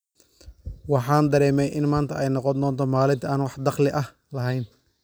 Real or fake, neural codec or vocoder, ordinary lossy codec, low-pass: real; none; none; none